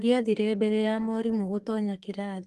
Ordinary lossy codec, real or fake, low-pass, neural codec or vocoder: Opus, 24 kbps; fake; 14.4 kHz; codec, 32 kHz, 1.9 kbps, SNAC